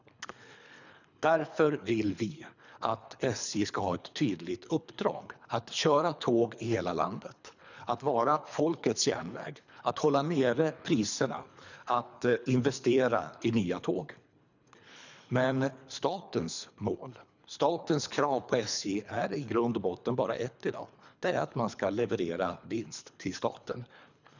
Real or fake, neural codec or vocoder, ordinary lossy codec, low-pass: fake; codec, 24 kHz, 3 kbps, HILCodec; none; 7.2 kHz